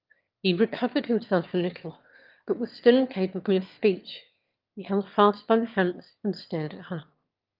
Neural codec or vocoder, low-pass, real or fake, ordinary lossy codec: autoencoder, 22.05 kHz, a latent of 192 numbers a frame, VITS, trained on one speaker; 5.4 kHz; fake; Opus, 24 kbps